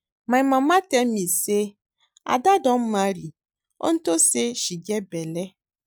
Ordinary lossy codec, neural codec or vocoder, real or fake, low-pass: none; none; real; none